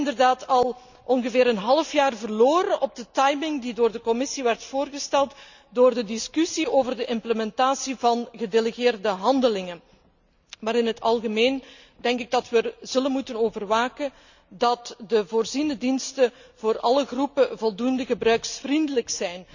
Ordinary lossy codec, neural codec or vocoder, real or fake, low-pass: none; none; real; 7.2 kHz